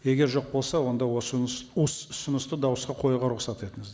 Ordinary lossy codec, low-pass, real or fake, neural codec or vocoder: none; none; real; none